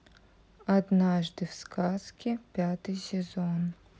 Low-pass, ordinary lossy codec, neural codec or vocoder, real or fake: none; none; none; real